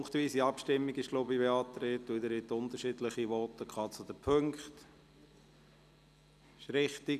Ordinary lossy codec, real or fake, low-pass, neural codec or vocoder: none; real; 14.4 kHz; none